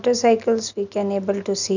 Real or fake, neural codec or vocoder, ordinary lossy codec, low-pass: real; none; none; 7.2 kHz